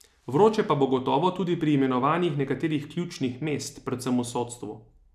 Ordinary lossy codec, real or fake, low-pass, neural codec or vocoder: none; real; 14.4 kHz; none